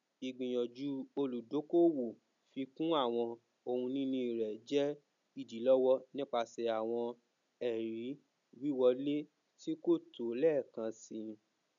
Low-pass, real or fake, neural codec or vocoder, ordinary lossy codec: 7.2 kHz; real; none; none